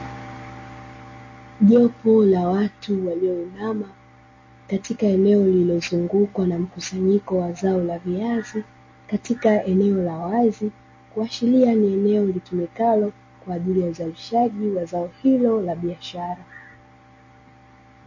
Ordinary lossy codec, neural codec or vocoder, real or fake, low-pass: MP3, 32 kbps; none; real; 7.2 kHz